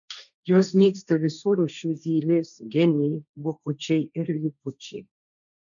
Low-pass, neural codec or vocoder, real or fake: 7.2 kHz; codec, 16 kHz, 1.1 kbps, Voila-Tokenizer; fake